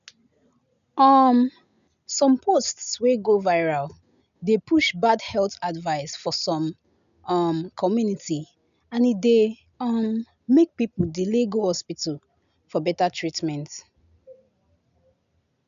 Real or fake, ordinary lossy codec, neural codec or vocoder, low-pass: real; none; none; 7.2 kHz